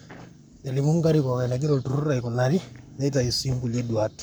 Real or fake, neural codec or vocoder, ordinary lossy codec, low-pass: fake; codec, 44.1 kHz, 7.8 kbps, Pupu-Codec; none; none